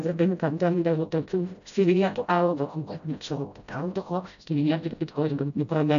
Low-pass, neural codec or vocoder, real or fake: 7.2 kHz; codec, 16 kHz, 0.5 kbps, FreqCodec, smaller model; fake